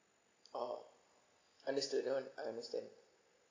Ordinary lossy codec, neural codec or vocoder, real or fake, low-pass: AAC, 32 kbps; none; real; 7.2 kHz